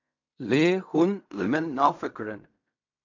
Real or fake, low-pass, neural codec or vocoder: fake; 7.2 kHz; codec, 16 kHz in and 24 kHz out, 0.4 kbps, LongCat-Audio-Codec, fine tuned four codebook decoder